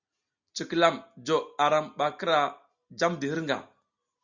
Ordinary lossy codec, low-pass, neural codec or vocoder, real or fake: Opus, 64 kbps; 7.2 kHz; none; real